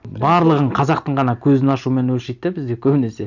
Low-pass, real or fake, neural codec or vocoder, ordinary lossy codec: 7.2 kHz; real; none; none